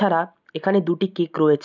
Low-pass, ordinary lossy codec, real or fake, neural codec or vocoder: 7.2 kHz; none; real; none